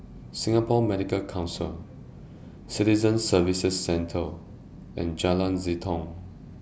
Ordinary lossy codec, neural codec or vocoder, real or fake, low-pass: none; none; real; none